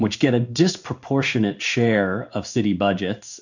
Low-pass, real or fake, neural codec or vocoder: 7.2 kHz; fake; codec, 16 kHz in and 24 kHz out, 1 kbps, XY-Tokenizer